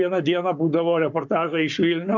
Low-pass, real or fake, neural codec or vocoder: 7.2 kHz; fake; codec, 44.1 kHz, 7.8 kbps, Pupu-Codec